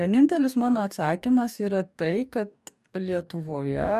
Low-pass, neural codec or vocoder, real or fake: 14.4 kHz; codec, 44.1 kHz, 2.6 kbps, DAC; fake